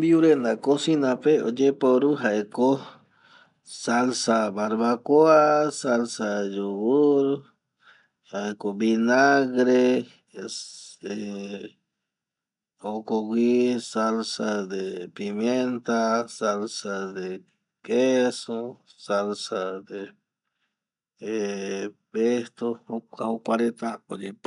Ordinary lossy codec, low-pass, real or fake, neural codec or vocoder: none; 10.8 kHz; real; none